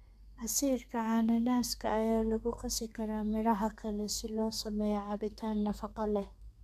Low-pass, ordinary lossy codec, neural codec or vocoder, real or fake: 14.4 kHz; none; codec, 32 kHz, 1.9 kbps, SNAC; fake